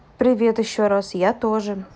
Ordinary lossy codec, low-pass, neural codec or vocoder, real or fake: none; none; none; real